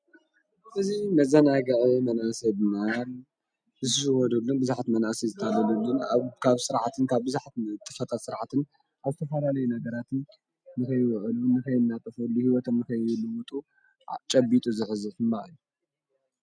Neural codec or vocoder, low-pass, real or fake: none; 9.9 kHz; real